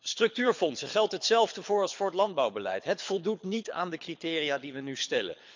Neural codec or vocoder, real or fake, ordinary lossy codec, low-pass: codec, 24 kHz, 6 kbps, HILCodec; fake; MP3, 64 kbps; 7.2 kHz